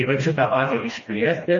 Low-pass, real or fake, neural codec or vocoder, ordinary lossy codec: 7.2 kHz; fake; codec, 16 kHz, 1 kbps, FreqCodec, smaller model; MP3, 32 kbps